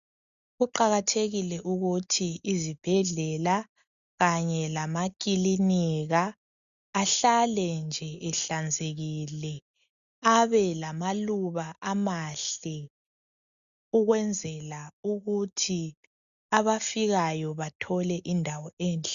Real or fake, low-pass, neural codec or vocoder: real; 7.2 kHz; none